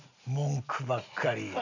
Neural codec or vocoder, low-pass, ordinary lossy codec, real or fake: none; 7.2 kHz; none; real